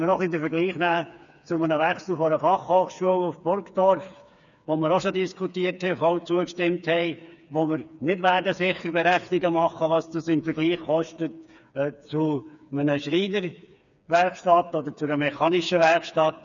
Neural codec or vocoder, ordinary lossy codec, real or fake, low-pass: codec, 16 kHz, 4 kbps, FreqCodec, smaller model; none; fake; 7.2 kHz